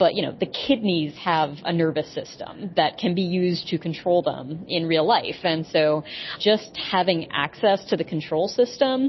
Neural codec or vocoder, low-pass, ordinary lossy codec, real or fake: none; 7.2 kHz; MP3, 24 kbps; real